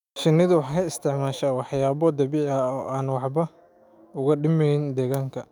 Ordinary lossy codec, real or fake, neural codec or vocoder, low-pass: none; fake; autoencoder, 48 kHz, 128 numbers a frame, DAC-VAE, trained on Japanese speech; 19.8 kHz